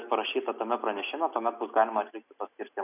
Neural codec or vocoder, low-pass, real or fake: none; 3.6 kHz; real